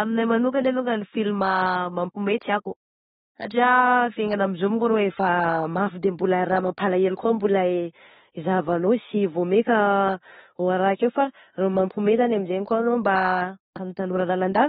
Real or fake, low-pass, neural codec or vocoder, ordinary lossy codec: fake; 19.8 kHz; autoencoder, 48 kHz, 32 numbers a frame, DAC-VAE, trained on Japanese speech; AAC, 16 kbps